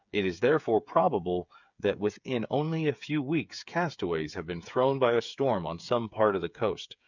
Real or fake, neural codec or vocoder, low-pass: fake; codec, 16 kHz, 8 kbps, FreqCodec, smaller model; 7.2 kHz